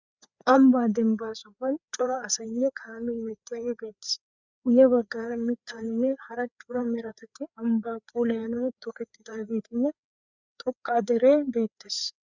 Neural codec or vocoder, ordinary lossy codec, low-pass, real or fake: codec, 16 kHz, 4 kbps, FreqCodec, larger model; Opus, 64 kbps; 7.2 kHz; fake